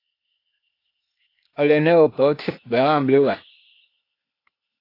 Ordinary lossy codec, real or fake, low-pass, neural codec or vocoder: AAC, 32 kbps; fake; 5.4 kHz; codec, 16 kHz, 0.8 kbps, ZipCodec